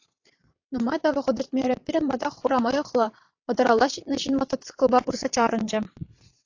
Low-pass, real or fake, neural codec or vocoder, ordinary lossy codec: 7.2 kHz; fake; vocoder, 22.05 kHz, 80 mel bands, WaveNeXt; AAC, 48 kbps